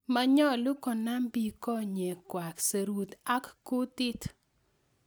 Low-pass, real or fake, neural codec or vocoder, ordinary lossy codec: none; real; none; none